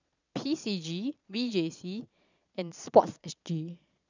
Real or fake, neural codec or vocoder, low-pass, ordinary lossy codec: fake; vocoder, 22.05 kHz, 80 mel bands, Vocos; 7.2 kHz; none